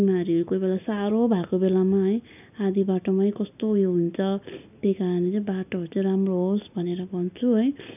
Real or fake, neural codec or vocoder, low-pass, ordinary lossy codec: real; none; 3.6 kHz; none